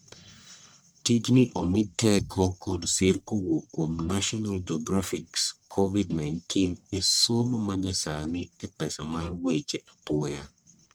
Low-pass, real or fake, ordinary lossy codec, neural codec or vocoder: none; fake; none; codec, 44.1 kHz, 1.7 kbps, Pupu-Codec